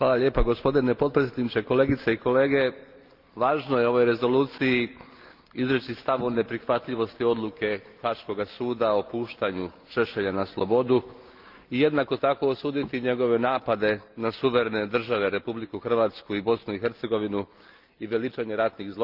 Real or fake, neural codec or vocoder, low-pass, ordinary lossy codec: real; none; 5.4 kHz; Opus, 32 kbps